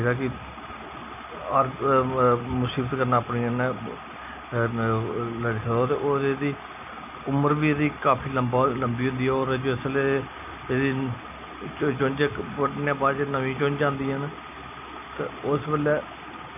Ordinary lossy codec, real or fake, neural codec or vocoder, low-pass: none; real; none; 3.6 kHz